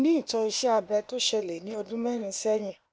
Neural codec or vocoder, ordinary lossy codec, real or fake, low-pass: codec, 16 kHz, 0.8 kbps, ZipCodec; none; fake; none